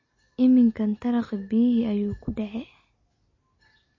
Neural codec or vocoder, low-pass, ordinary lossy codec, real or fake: none; 7.2 kHz; MP3, 32 kbps; real